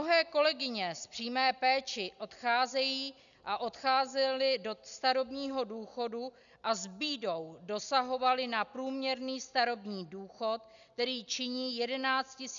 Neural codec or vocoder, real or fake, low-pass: none; real; 7.2 kHz